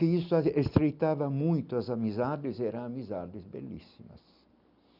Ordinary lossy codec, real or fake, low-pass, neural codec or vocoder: none; real; 5.4 kHz; none